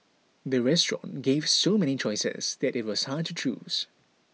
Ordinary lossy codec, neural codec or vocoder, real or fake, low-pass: none; none; real; none